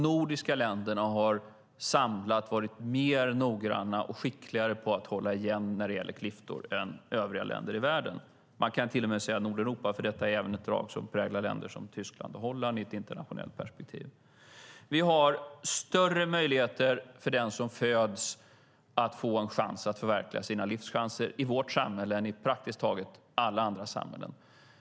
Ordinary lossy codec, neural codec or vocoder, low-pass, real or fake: none; none; none; real